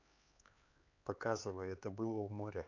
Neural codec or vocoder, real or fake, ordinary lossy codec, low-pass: codec, 16 kHz, 4 kbps, X-Codec, HuBERT features, trained on LibriSpeech; fake; AAC, 48 kbps; 7.2 kHz